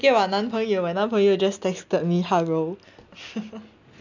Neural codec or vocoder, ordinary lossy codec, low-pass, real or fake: none; none; 7.2 kHz; real